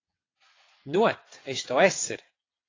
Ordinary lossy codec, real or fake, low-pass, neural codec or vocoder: AAC, 32 kbps; fake; 7.2 kHz; vocoder, 22.05 kHz, 80 mel bands, WaveNeXt